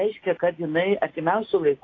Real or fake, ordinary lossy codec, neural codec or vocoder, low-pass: real; AAC, 32 kbps; none; 7.2 kHz